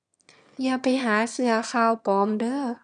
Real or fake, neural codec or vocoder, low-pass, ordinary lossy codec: fake; autoencoder, 22.05 kHz, a latent of 192 numbers a frame, VITS, trained on one speaker; 9.9 kHz; none